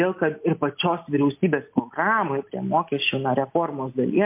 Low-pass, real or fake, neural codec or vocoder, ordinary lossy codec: 3.6 kHz; real; none; MP3, 32 kbps